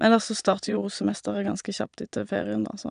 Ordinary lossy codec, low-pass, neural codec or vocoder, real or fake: none; 9.9 kHz; vocoder, 22.05 kHz, 80 mel bands, Vocos; fake